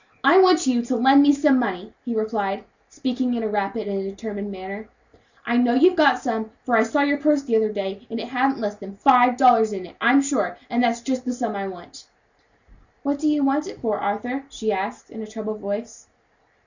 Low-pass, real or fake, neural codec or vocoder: 7.2 kHz; real; none